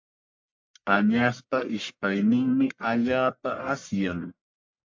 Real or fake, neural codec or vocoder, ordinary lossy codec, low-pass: fake; codec, 44.1 kHz, 1.7 kbps, Pupu-Codec; MP3, 48 kbps; 7.2 kHz